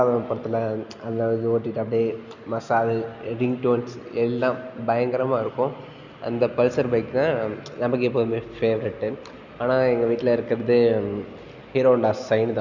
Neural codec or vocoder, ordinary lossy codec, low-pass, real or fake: none; none; 7.2 kHz; real